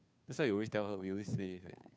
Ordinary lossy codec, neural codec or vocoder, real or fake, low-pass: none; codec, 16 kHz, 2 kbps, FunCodec, trained on Chinese and English, 25 frames a second; fake; none